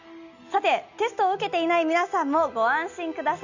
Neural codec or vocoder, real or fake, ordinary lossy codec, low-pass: none; real; none; 7.2 kHz